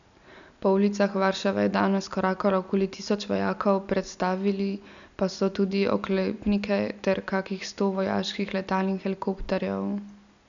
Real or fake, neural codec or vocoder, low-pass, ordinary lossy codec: real; none; 7.2 kHz; none